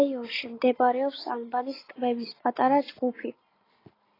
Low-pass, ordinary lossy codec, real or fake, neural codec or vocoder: 5.4 kHz; AAC, 24 kbps; real; none